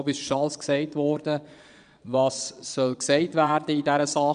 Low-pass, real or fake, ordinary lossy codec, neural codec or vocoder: 9.9 kHz; fake; none; vocoder, 22.05 kHz, 80 mel bands, Vocos